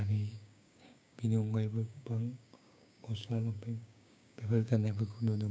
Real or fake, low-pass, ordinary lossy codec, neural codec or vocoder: fake; none; none; codec, 16 kHz, 6 kbps, DAC